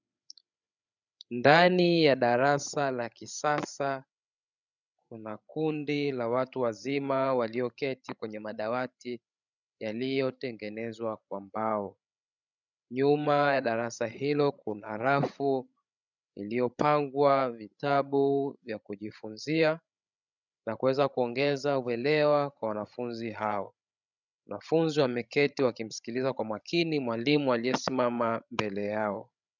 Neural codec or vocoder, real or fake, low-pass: codec, 16 kHz, 16 kbps, FreqCodec, larger model; fake; 7.2 kHz